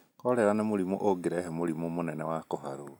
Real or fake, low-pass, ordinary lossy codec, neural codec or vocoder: real; 19.8 kHz; none; none